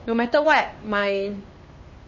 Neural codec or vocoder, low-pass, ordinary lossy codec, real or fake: codec, 16 kHz, 2 kbps, X-Codec, HuBERT features, trained on LibriSpeech; 7.2 kHz; MP3, 32 kbps; fake